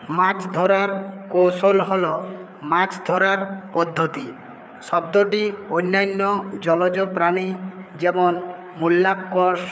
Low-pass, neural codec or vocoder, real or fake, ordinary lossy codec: none; codec, 16 kHz, 4 kbps, FreqCodec, larger model; fake; none